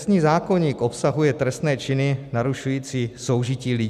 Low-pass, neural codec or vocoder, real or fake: 14.4 kHz; none; real